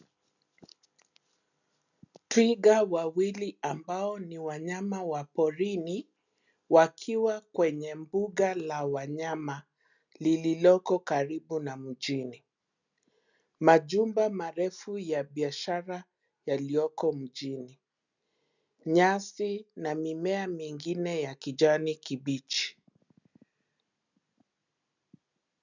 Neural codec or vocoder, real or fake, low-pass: none; real; 7.2 kHz